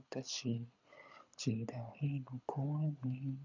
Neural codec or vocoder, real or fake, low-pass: codec, 24 kHz, 6 kbps, HILCodec; fake; 7.2 kHz